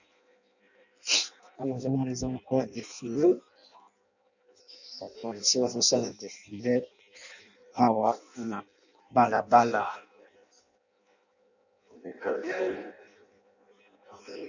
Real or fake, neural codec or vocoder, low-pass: fake; codec, 16 kHz in and 24 kHz out, 0.6 kbps, FireRedTTS-2 codec; 7.2 kHz